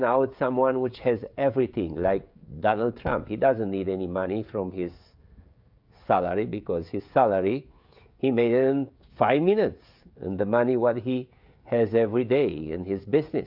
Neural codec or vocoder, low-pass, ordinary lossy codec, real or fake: codec, 16 kHz, 16 kbps, FreqCodec, smaller model; 5.4 kHz; AAC, 48 kbps; fake